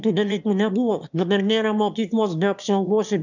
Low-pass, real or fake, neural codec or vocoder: 7.2 kHz; fake; autoencoder, 22.05 kHz, a latent of 192 numbers a frame, VITS, trained on one speaker